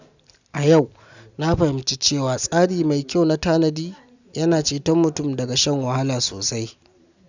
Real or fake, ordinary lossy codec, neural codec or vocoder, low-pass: real; none; none; 7.2 kHz